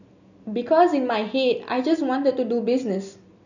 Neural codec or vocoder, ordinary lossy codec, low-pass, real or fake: none; none; 7.2 kHz; real